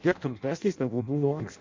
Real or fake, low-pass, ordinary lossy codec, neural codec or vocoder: fake; 7.2 kHz; MP3, 48 kbps; codec, 16 kHz in and 24 kHz out, 0.6 kbps, FireRedTTS-2 codec